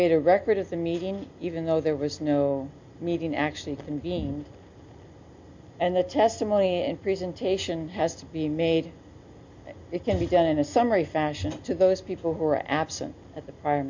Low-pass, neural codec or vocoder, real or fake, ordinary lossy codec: 7.2 kHz; none; real; MP3, 48 kbps